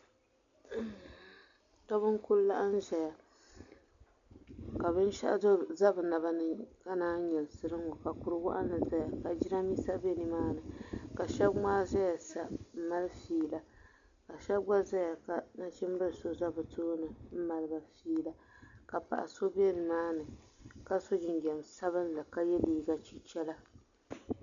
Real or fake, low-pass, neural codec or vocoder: real; 7.2 kHz; none